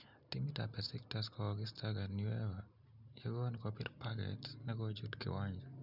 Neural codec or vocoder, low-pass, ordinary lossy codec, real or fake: none; 5.4 kHz; none; real